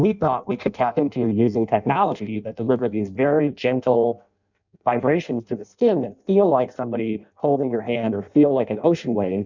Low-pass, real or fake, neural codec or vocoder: 7.2 kHz; fake; codec, 16 kHz in and 24 kHz out, 0.6 kbps, FireRedTTS-2 codec